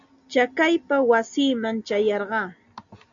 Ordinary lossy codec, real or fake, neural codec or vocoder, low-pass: MP3, 64 kbps; real; none; 7.2 kHz